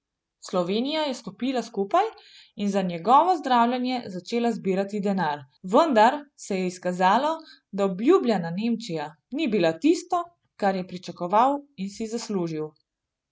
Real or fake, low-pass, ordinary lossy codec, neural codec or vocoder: real; none; none; none